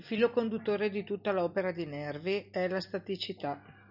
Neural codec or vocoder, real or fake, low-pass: none; real; 5.4 kHz